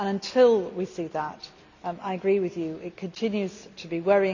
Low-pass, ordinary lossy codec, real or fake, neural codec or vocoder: 7.2 kHz; none; real; none